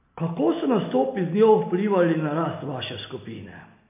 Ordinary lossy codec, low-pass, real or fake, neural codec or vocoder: MP3, 24 kbps; 3.6 kHz; real; none